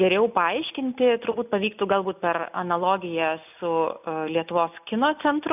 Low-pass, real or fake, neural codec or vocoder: 3.6 kHz; real; none